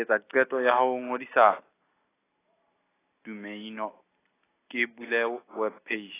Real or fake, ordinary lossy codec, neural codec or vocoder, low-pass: real; AAC, 16 kbps; none; 3.6 kHz